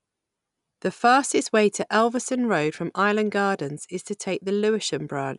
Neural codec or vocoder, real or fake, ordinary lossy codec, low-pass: none; real; none; 10.8 kHz